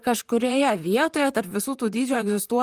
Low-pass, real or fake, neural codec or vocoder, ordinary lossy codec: 14.4 kHz; fake; vocoder, 44.1 kHz, 128 mel bands, Pupu-Vocoder; Opus, 32 kbps